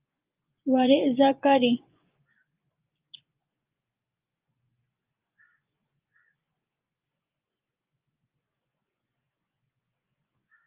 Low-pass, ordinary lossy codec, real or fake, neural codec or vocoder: 3.6 kHz; Opus, 24 kbps; fake; vocoder, 24 kHz, 100 mel bands, Vocos